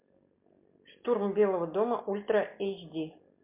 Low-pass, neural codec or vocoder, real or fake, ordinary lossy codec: 3.6 kHz; none; real; MP3, 32 kbps